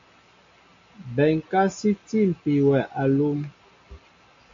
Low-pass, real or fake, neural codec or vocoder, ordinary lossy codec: 7.2 kHz; real; none; AAC, 48 kbps